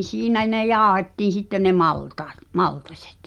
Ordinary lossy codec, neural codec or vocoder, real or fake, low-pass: Opus, 32 kbps; none; real; 19.8 kHz